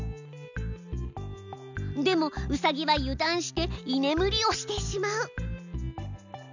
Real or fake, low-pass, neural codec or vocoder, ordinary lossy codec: real; 7.2 kHz; none; none